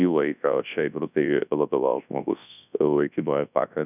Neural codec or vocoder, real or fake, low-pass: codec, 24 kHz, 0.9 kbps, WavTokenizer, large speech release; fake; 3.6 kHz